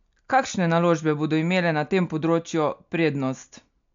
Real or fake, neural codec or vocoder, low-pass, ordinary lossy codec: real; none; 7.2 kHz; MP3, 64 kbps